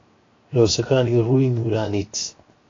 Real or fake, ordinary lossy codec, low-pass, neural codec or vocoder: fake; AAC, 32 kbps; 7.2 kHz; codec, 16 kHz, 0.7 kbps, FocalCodec